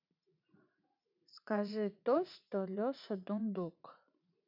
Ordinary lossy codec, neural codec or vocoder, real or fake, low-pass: none; vocoder, 44.1 kHz, 80 mel bands, Vocos; fake; 5.4 kHz